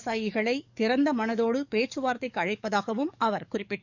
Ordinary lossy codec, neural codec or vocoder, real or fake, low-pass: none; codec, 44.1 kHz, 7.8 kbps, DAC; fake; 7.2 kHz